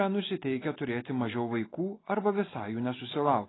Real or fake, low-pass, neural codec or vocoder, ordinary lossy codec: real; 7.2 kHz; none; AAC, 16 kbps